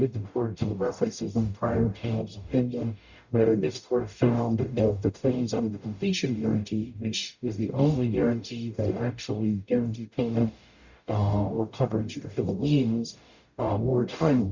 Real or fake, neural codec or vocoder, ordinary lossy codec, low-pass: fake; codec, 44.1 kHz, 0.9 kbps, DAC; Opus, 64 kbps; 7.2 kHz